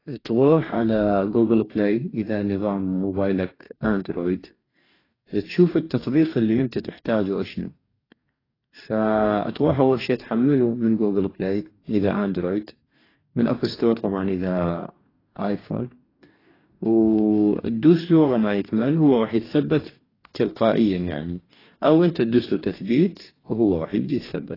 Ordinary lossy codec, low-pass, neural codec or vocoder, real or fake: AAC, 24 kbps; 5.4 kHz; codec, 44.1 kHz, 2.6 kbps, DAC; fake